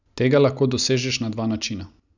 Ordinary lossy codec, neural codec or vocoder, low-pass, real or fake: none; none; 7.2 kHz; real